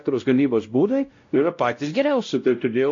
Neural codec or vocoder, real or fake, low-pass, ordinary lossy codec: codec, 16 kHz, 0.5 kbps, X-Codec, WavLM features, trained on Multilingual LibriSpeech; fake; 7.2 kHz; AAC, 48 kbps